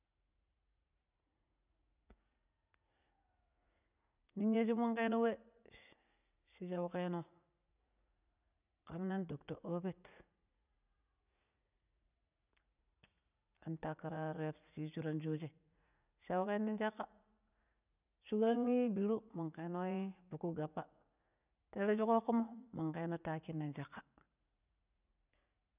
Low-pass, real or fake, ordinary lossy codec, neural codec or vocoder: 3.6 kHz; fake; none; vocoder, 44.1 kHz, 80 mel bands, Vocos